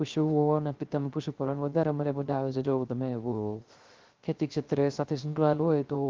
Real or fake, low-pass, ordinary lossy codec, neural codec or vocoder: fake; 7.2 kHz; Opus, 32 kbps; codec, 16 kHz, 0.3 kbps, FocalCodec